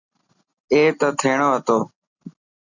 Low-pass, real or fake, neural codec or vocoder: 7.2 kHz; real; none